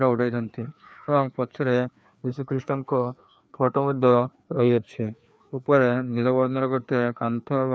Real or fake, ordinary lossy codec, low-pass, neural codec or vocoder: fake; none; none; codec, 16 kHz, 2 kbps, FreqCodec, larger model